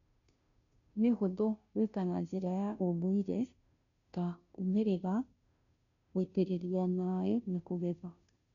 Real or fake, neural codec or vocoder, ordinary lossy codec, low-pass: fake; codec, 16 kHz, 0.5 kbps, FunCodec, trained on Chinese and English, 25 frames a second; none; 7.2 kHz